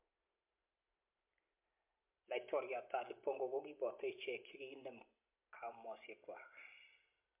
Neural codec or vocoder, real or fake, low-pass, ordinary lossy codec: none; real; 3.6 kHz; MP3, 32 kbps